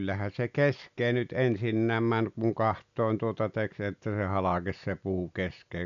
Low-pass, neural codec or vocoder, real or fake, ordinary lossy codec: 7.2 kHz; none; real; none